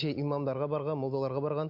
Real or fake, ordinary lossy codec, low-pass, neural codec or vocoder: real; none; 5.4 kHz; none